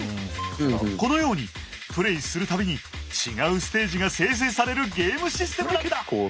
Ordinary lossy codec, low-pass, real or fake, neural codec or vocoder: none; none; real; none